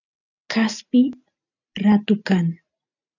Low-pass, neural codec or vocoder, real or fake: 7.2 kHz; none; real